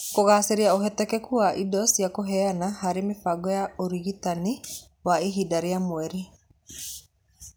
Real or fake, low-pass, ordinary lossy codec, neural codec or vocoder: real; none; none; none